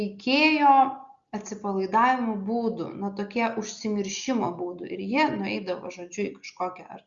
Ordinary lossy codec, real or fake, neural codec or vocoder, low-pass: Opus, 64 kbps; real; none; 7.2 kHz